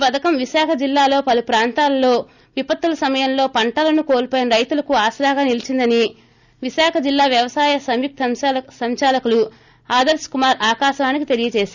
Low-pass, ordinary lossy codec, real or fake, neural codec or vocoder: 7.2 kHz; none; real; none